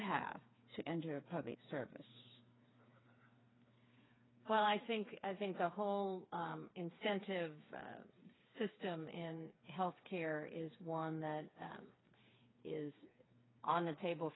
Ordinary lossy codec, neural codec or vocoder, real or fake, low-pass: AAC, 16 kbps; codec, 16 kHz, 4 kbps, FreqCodec, smaller model; fake; 7.2 kHz